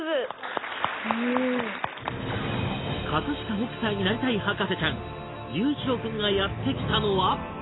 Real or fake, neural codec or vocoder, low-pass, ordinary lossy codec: real; none; 7.2 kHz; AAC, 16 kbps